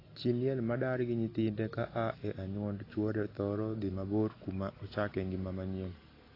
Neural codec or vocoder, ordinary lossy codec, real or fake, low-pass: none; AAC, 24 kbps; real; 5.4 kHz